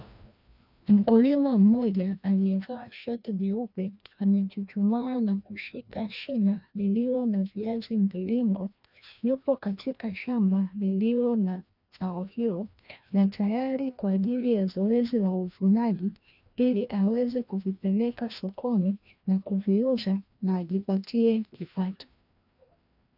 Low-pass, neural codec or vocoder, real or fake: 5.4 kHz; codec, 16 kHz, 1 kbps, FreqCodec, larger model; fake